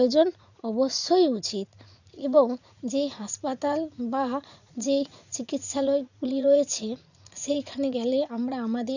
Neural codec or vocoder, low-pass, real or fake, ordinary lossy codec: vocoder, 44.1 kHz, 80 mel bands, Vocos; 7.2 kHz; fake; none